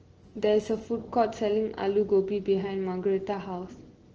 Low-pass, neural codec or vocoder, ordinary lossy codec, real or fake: 7.2 kHz; none; Opus, 16 kbps; real